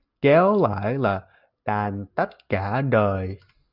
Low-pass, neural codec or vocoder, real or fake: 5.4 kHz; none; real